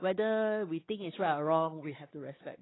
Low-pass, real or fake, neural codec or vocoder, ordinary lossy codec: 7.2 kHz; fake; codec, 16 kHz, 4 kbps, X-Codec, WavLM features, trained on Multilingual LibriSpeech; AAC, 16 kbps